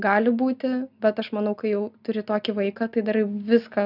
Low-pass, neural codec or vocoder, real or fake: 5.4 kHz; none; real